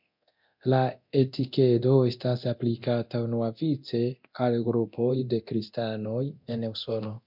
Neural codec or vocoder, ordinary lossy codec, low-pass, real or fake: codec, 24 kHz, 0.9 kbps, DualCodec; MP3, 48 kbps; 5.4 kHz; fake